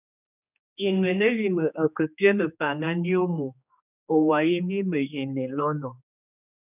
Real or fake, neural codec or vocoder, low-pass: fake; codec, 16 kHz, 2 kbps, X-Codec, HuBERT features, trained on general audio; 3.6 kHz